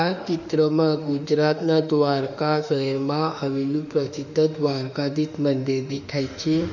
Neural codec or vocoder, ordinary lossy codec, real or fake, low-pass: autoencoder, 48 kHz, 32 numbers a frame, DAC-VAE, trained on Japanese speech; none; fake; 7.2 kHz